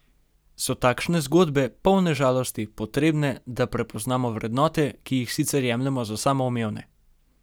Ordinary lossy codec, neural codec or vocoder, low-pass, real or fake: none; none; none; real